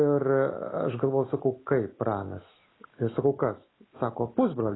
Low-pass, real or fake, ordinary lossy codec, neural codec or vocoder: 7.2 kHz; real; AAC, 16 kbps; none